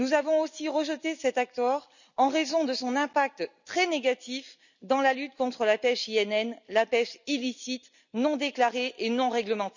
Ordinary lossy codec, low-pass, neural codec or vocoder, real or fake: none; 7.2 kHz; none; real